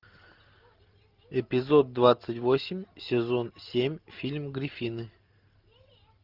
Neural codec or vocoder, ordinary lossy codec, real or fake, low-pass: none; Opus, 32 kbps; real; 5.4 kHz